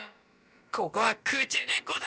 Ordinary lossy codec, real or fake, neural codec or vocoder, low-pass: none; fake; codec, 16 kHz, about 1 kbps, DyCAST, with the encoder's durations; none